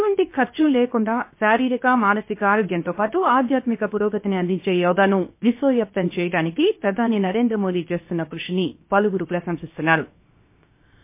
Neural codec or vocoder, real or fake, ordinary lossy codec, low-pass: codec, 16 kHz, 0.7 kbps, FocalCodec; fake; MP3, 24 kbps; 3.6 kHz